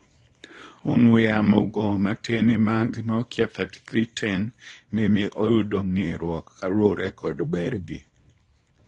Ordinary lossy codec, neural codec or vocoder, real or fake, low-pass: AAC, 32 kbps; codec, 24 kHz, 0.9 kbps, WavTokenizer, small release; fake; 10.8 kHz